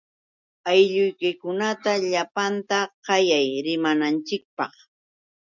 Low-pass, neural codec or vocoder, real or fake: 7.2 kHz; none; real